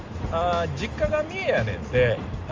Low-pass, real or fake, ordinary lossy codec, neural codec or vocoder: 7.2 kHz; real; Opus, 32 kbps; none